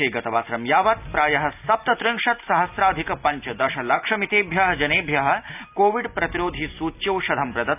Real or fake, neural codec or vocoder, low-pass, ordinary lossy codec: real; none; 3.6 kHz; none